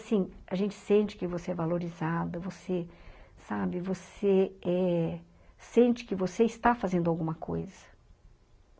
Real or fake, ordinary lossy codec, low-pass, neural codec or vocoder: real; none; none; none